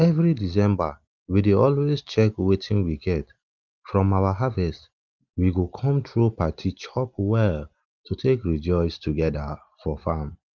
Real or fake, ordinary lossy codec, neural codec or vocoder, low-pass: real; Opus, 24 kbps; none; 7.2 kHz